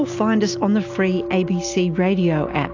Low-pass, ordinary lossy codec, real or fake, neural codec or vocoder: 7.2 kHz; AAC, 48 kbps; real; none